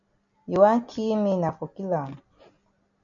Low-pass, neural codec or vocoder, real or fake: 7.2 kHz; none; real